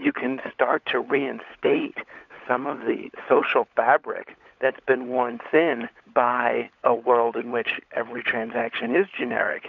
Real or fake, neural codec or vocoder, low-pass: fake; codec, 16 kHz, 16 kbps, FunCodec, trained on LibriTTS, 50 frames a second; 7.2 kHz